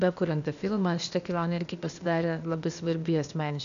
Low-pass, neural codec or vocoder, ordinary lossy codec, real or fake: 7.2 kHz; codec, 16 kHz, 0.8 kbps, ZipCodec; MP3, 96 kbps; fake